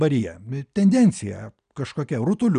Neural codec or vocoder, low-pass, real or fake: none; 9.9 kHz; real